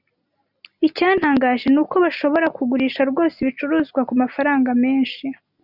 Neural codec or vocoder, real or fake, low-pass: none; real; 5.4 kHz